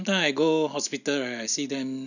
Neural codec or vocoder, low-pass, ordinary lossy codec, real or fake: none; 7.2 kHz; none; real